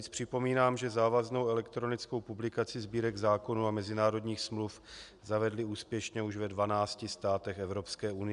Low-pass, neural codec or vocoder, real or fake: 10.8 kHz; none; real